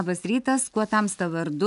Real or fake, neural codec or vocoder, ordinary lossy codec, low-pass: real; none; AAC, 96 kbps; 10.8 kHz